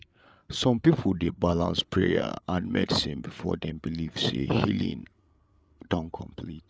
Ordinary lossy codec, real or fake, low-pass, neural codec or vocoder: none; fake; none; codec, 16 kHz, 8 kbps, FreqCodec, larger model